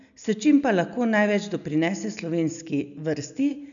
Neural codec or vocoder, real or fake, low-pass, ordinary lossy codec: none; real; 7.2 kHz; none